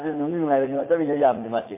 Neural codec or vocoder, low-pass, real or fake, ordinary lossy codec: vocoder, 44.1 kHz, 80 mel bands, Vocos; 3.6 kHz; fake; MP3, 24 kbps